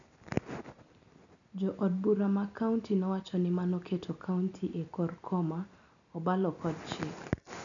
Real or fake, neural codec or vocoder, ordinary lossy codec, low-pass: real; none; none; 7.2 kHz